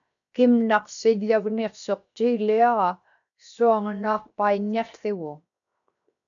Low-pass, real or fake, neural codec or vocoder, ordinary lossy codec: 7.2 kHz; fake; codec, 16 kHz, 0.7 kbps, FocalCodec; AAC, 64 kbps